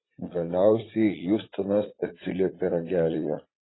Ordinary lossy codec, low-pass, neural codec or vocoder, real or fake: AAC, 16 kbps; 7.2 kHz; vocoder, 44.1 kHz, 80 mel bands, Vocos; fake